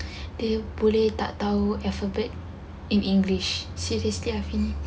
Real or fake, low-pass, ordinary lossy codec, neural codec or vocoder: real; none; none; none